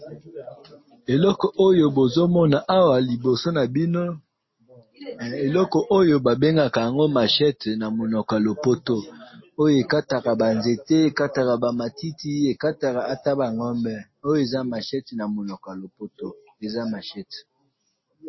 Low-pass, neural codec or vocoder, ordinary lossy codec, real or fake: 7.2 kHz; none; MP3, 24 kbps; real